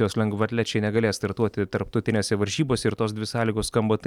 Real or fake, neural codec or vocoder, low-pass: real; none; 19.8 kHz